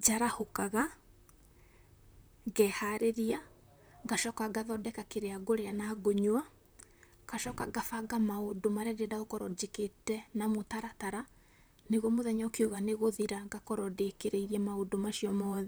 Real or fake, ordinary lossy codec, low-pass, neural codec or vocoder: fake; none; none; vocoder, 44.1 kHz, 128 mel bands, Pupu-Vocoder